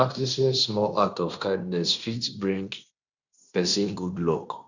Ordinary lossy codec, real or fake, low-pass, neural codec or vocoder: none; fake; 7.2 kHz; codec, 16 kHz in and 24 kHz out, 0.9 kbps, LongCat-Audio-Codec, fine tuned four codebook decoder